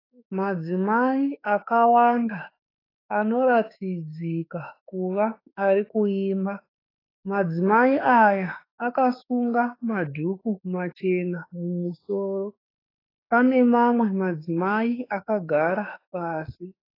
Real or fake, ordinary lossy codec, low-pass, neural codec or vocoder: fake; AAC, 24 kbps; 5.4 kHz; autoencoder, 48 kHz, 32 numbers a frame, DAC-VAE, trained on Japanese speech